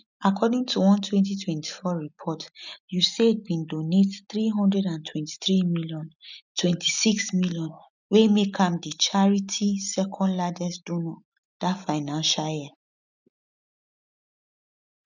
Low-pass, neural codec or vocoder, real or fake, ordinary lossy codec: 7.2 kHz; none; real; none